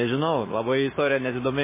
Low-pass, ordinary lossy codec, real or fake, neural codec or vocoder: 3.6 kHz; MP3, 16 kbps; fake; codec, 16 kHz, 6 kbps, DAC